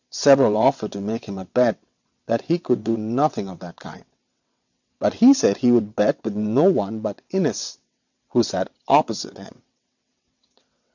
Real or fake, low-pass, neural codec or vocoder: fake; 7.2 kHz; vocoder, 22.05 kHz, 80 mel bands, WaveNeXt